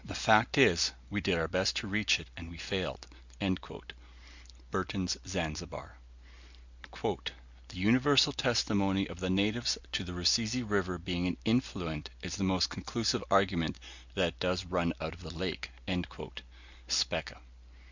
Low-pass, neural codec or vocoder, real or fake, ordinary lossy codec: 7.2 kHz; none; real; Opus, 64 kbps